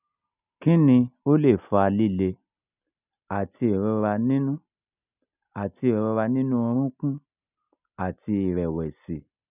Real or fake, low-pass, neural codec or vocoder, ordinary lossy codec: real; 3.6 kHz; none; none